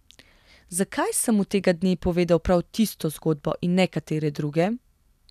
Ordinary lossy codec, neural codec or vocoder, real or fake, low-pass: none; none; real; 14.4 kHz